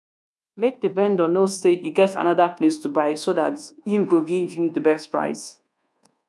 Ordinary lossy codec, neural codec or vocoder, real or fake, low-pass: none; codec, 24 kHz, 1.2 kbps, DualCodec; fake; none